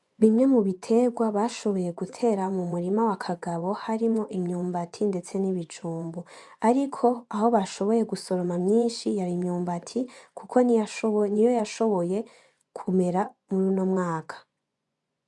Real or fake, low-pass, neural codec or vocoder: fake; 10.8 kHz; vocoder, 24 kHz, 100 mel bands, Vocos